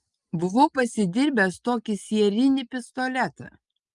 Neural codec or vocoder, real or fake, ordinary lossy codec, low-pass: none; real; Opus, 32 kbps; 10.8 kHz